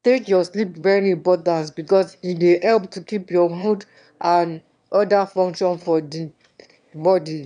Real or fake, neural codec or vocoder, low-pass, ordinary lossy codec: fake; autoencoder, 22.05 kHz, a latent of 192 numbers a frame, VITS, trained on one speaker; 9.9 kHz; none